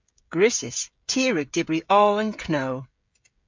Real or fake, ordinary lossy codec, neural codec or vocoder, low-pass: fake; MP3, 64 kbps; codec, 16 kHz, 16 kbps, FreqCodec, smaller model; 7.2 kHz